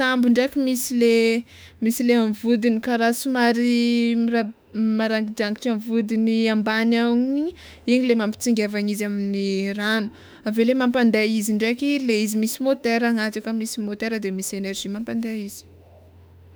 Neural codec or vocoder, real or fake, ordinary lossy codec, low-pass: autoencoder, 48 kHz, 32 numbers a frame, DAC-VAE, trained on Japanese speech; fake; none; none